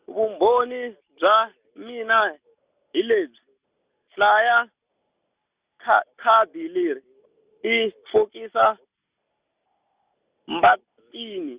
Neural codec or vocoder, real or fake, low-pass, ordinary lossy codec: none; real; 3.6 kHz; Opus, 64 kbps